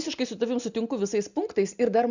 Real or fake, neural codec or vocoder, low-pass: real; none; 7.2 kHz